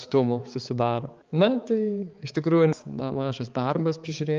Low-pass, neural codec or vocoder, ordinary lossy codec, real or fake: 7.2 kHz; codec, 16 kHz, 4 kbps, X-Codec, HuBERT features, trained on balanced general audio; Opus, 24 kbps; fake